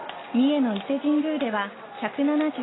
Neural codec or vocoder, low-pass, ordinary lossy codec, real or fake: none; 7.2 kHz; AAC, 16 kbps; real